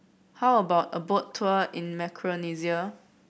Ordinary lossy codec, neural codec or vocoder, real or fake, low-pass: none; none; real; none